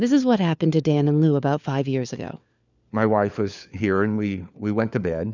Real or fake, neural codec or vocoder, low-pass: fake; codec, 16 kHz, 4 kbps, FunCodec, trained on LibriTTS, 50 frames a second; 7.2 kHz